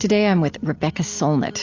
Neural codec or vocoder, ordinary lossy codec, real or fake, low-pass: none; AAC, 48 kbps; real; 7.2 kHz